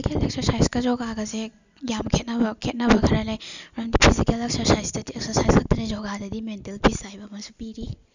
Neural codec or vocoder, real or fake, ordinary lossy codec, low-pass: none; real; none; 7.2 kHz